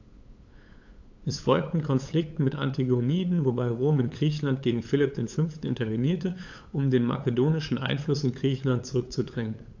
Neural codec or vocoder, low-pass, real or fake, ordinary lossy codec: codec, 16 kHz, 8 kbps, FunCodec, trained on LibriTTS, 25 frames a second; 7.2 kHz; fake; AAC, 48 kbps